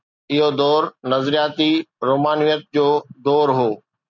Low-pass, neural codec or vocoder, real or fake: 7.2 kHz; none; real